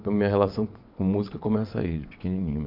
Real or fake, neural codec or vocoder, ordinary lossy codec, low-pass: fake; vocoder, 22.05 kHz, 80 mel bands, WaveNeXt; MP3, 48 kbps; 5.4 kHz